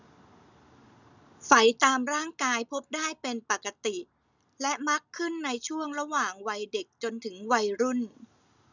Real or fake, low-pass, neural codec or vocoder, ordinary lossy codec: real; 7.2 kHz; none; none